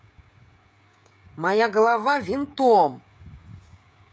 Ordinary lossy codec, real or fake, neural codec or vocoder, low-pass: none; fake; codec, 16 kHz, 16 kbps, FreqCodec, smaller model; none